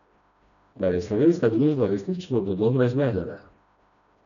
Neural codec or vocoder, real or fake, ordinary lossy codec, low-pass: codec, 16 kHz, 1 kbps, FreqCodec, smaller model; fake; none; 7.2 kHz